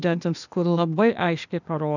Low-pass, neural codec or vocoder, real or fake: 7.2 kHz; codec, 16 kHz, 0.8 kbps, ZipCodec; fake